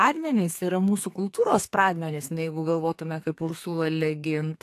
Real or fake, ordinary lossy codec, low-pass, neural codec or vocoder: fake; AAC, 64 kbps; 14.4 kHz; codec, 32 kHz, 1.9 kbps, SNAC